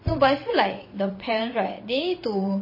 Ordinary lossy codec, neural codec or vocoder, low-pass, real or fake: MP3, 24 kbps; vocoder, 22.05 kHz, 80 mel bands, WaveNeXt; 5.4 kHz; fake